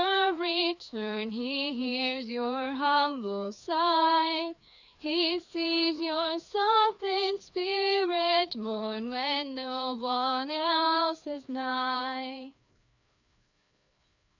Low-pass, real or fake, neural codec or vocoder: 7.2 kHz; fake; codec, 16 kHz, 2 kbps, FreqCodec, larger model